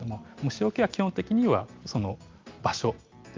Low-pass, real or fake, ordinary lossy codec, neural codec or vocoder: 7.2 kHz; real; Opus, 24 kbps; none